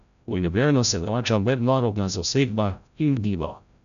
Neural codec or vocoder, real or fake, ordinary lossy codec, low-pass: codec, 16 kHz, 0.5 kbps, FreqCodec, larger model; fake; none; 7.2 kHz